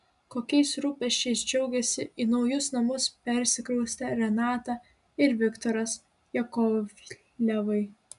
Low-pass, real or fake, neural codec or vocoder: 10.8 kHz; real; none